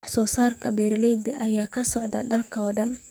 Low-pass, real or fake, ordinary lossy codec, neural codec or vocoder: none; fake; none; codec, 44.1 kHz, 2.6 kbps, SNAC